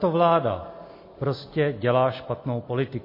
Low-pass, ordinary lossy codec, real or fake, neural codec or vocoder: 5.4 kHz; MP3, 24 kbps; real; none